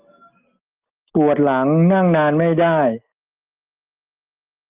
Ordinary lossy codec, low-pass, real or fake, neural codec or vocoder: Opus, 32 kbps; 3.6 kHz; real; none